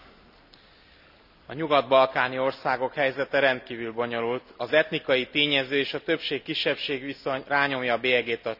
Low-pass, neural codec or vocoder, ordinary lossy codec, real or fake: 5.4 kHz; none; none; real